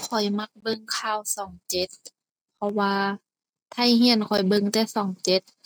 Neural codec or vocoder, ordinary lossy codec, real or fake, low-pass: none; none; real; none